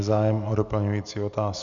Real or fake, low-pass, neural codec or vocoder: real; 7.2 kHz; none